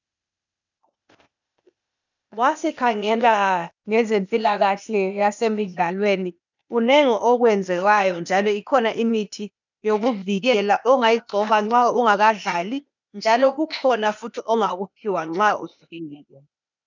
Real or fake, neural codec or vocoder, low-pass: fake; codec, 16 kHz, 0.8 kbps, ZipCodec; 7.2 kHz